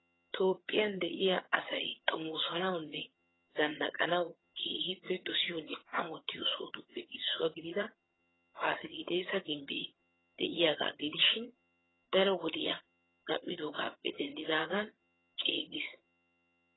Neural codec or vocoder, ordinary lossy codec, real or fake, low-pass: vocoder, 22.05 kHz, 80 mel bands, HiFi-GAN; AAC, 16 kbps; fake; 7.2 kHz